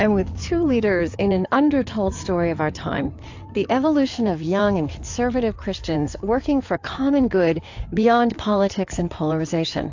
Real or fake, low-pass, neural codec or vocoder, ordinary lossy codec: fake; 7.2 kHz; codec, 16 kHz in and 24 kHz out, 2.2 kbps, FireRedTTS-2 codec; AAC, 48 kbps